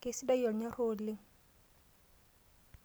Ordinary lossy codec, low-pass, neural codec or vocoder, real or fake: none; none; none; real